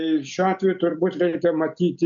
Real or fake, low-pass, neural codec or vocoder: real; 7.2 kHz; none